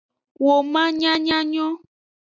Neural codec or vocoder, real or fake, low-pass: none; real; 7.2 kHz